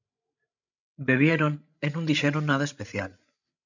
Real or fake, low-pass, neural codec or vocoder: fake; 7.2 kHz; codec, 16 kHz, 16 kbps, FreqCodec, larger model